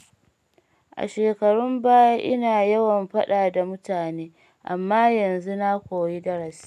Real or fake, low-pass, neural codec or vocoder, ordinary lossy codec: real; 14.4 kHz; none; none